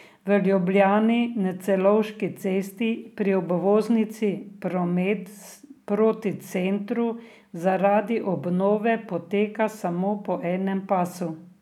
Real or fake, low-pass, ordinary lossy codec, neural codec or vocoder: real; 19.8 kHz; none; none